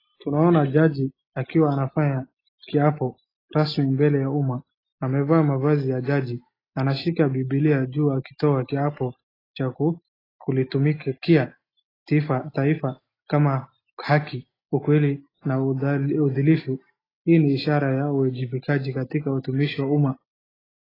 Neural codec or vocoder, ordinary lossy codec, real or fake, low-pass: none; AAC, 24 kbps; real; 5.4 kHz